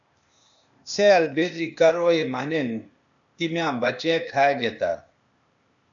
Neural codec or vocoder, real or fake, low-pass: codec, 16 kHz, 0.8 kbps, ZipCodec; fake; 7.2 kHz